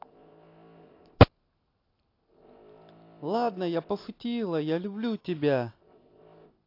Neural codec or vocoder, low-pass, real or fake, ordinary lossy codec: none; 5.4 kHz; real; AAC, 32 kbps